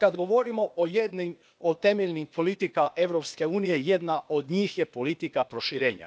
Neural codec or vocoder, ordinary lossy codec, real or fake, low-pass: codec, 16 kHz, 0.8 kbps, ZipCodec; none; fake; none